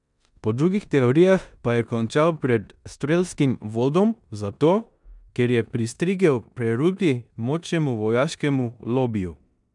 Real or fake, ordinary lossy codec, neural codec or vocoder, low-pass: fake; none; codec, 16 kHz in and 24 kHz out, 0.9 kbps, LongCat-Audio-Codec, four codebook decoder; 10.8 kHz